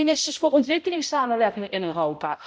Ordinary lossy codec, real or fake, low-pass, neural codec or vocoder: none; fake; none; codec, 16 kHz, 0.5 kbps, X-Codec, HuBERT features, trained on balanced general audio